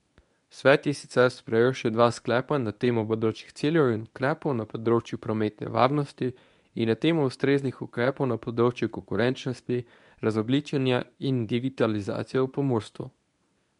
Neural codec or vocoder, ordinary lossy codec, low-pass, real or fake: codec, 24 kHz, 0.9 kbps, WavTokenizer, medium speech release version 1; none; 10.8 kHz; fake